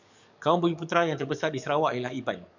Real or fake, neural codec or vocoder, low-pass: fake; codec, 44.1 kHz, 7.8 kbps, DAC; 7.2 kHz